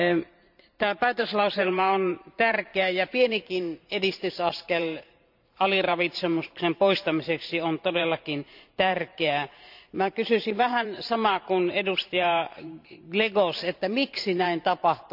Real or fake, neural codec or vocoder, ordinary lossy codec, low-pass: fake; vocoder, 44.1 kHz, 128 mel bands every 512 samples, BigVGAN v2; none; 5.4 kHz